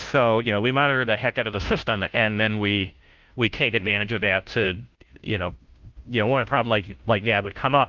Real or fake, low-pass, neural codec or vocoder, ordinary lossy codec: fake; 7.2 kHz; codec, 16 kHz, 0.5 kbps, FunCodec, trained on Chinese and English, 25 frames a second; Opus, 32 kbps